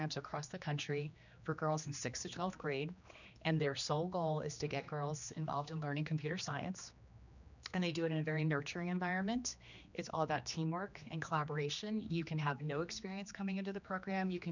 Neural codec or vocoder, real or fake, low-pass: codec, 16 kHz, 2 kbps, X-Codec, HuBERT features, trained on general audio; fake; 7.2 kHz